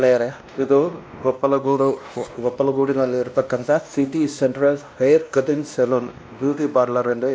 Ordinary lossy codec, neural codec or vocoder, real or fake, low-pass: none; codec, 16 kHz, 1 kbps, X-Codec, WavLM features, trained on Multilingual LibriSpeech; fake; none